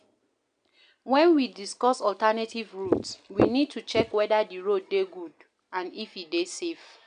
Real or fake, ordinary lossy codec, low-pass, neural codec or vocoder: real; none; 9.9 kHz; none